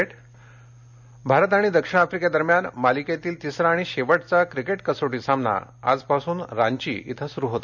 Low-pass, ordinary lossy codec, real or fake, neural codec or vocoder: 7.2 kHz; none; real; none